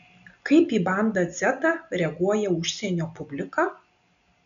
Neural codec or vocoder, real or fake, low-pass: none; real; 7.2 kHz